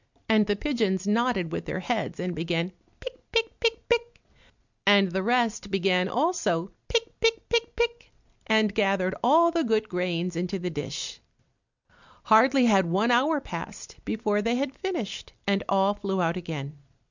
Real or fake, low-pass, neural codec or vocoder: real; 7.2 kHz; none